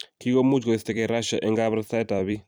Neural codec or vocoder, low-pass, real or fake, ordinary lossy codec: vocoder, 44.1 kHz, 128 mel bands every 256 samples, BigVGAN v2; none; fake; none